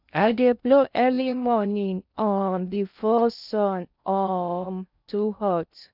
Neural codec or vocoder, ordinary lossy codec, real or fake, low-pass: codec, 16 kHz in and 24 kHz out, 0.6 kbps, FocalCodec, streaming, 2048 codes; none; fake; 5.4 kHz